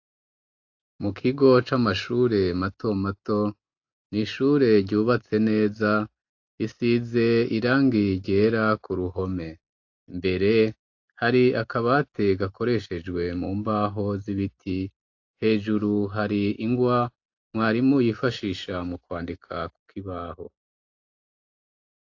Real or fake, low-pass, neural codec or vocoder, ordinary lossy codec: real; 7.2 kHz; none; AAC, 48 kbps